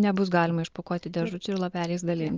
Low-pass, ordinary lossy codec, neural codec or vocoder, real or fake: 7.2 kHz; Opus, 24 kbps; none; real